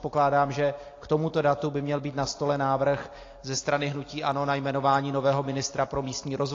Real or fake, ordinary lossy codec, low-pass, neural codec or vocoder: real; AAC, 32 kbps; 7.2 kHz; none